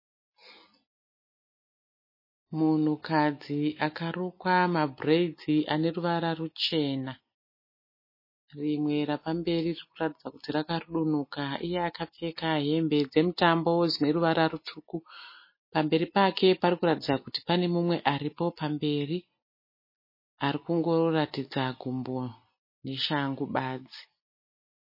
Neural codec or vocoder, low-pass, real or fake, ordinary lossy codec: none; 5.4 kHz; real; MP3, 24 kbps